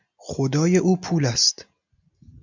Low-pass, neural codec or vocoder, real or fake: 7.2 kHz; none; real